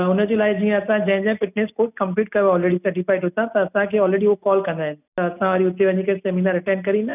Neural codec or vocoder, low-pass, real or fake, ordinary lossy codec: none; 3.6 kHz; real; none